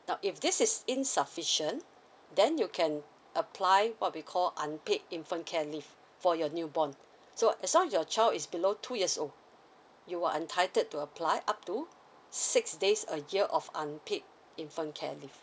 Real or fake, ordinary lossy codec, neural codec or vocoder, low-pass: real; none; none; none